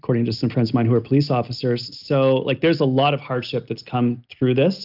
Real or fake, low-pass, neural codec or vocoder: real; 5.4 kHz; none